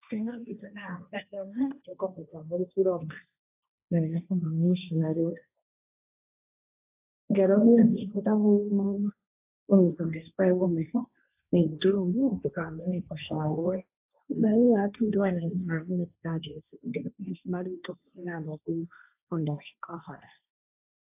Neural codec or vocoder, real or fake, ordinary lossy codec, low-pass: codec, 16 kHz, 1.1 kbps, Voila-Tokenizer; fake; AAC, 32 kbps; 3.6 kHz